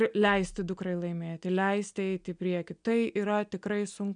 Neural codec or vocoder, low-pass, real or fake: none; 9.9 kHz; real